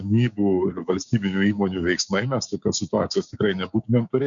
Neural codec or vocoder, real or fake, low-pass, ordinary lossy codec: codec, 16 kHz, 6 kbps, DAC; fake; 7.2 kHz; MP3, 96 kbps